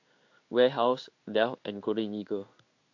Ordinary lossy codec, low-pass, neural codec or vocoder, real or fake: AAC, 48 kbps; 7.2 kHz; codec, 16 kHz in and 24 kHz out, 1 kbps, XY-Tokenizer; fake